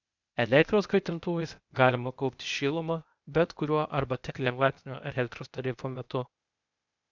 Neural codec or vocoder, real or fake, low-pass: codec, 16 kHz, 0.8 kbps, ZipCodec; fake; 7.2 kHz